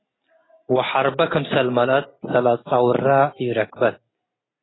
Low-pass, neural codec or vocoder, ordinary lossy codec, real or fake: 7.2 kHz; none; AAC, 16 kbps; real